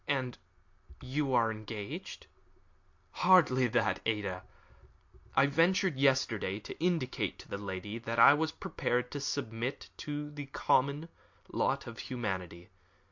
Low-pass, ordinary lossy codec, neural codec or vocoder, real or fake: 7.2 kHz; MP3, 48 kbps; none; real